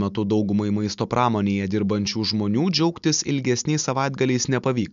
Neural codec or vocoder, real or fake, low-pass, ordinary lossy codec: none; real; 7.2 kHz; MP3, 96 kbps